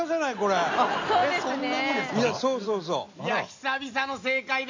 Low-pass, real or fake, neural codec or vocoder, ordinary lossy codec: 7.2 kHz; real; none; MP3, 64 kbps